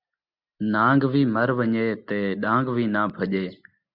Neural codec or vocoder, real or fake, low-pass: none; real; 5.4 kHz